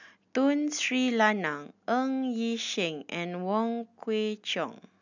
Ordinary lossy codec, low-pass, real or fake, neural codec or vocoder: none; 7.2 kHz; real; none